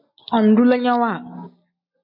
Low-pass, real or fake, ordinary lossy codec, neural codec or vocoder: 5.4 kHz; real; MP3, 32 kbps; none